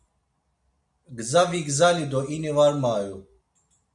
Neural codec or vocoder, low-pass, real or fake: none; 10.8 kHz; real